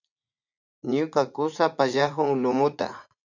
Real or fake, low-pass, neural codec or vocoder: fake; 7.2 kHz; vocoder, 44.1 kHz, 80 mel bands, Vocos